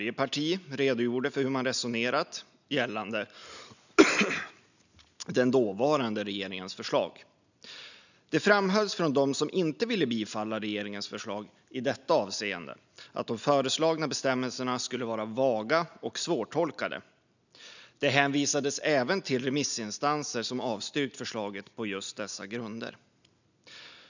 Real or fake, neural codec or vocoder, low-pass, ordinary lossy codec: real; none; 7.2 kHz; none